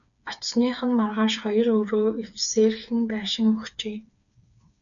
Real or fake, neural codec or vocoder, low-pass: fake; codec, 16 kHz, 4 kbps, FreqCodec, smaller model; 7.2 kHz